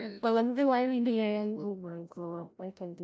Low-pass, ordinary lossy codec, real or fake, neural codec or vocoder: none; none; fake; codec, 16 kHz, 0.5 kbps, FreqCodec, larger model